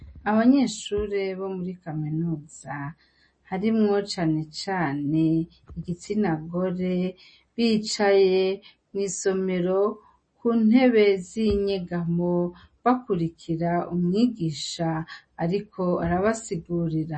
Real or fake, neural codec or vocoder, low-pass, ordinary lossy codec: real; none; 9.9 kHz; MP3, 32 kbps